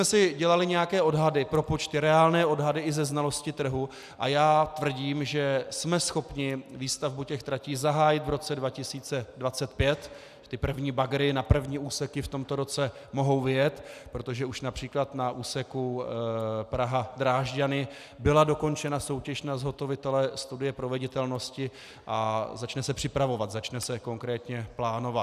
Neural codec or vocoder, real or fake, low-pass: none; real; 14.4 kHz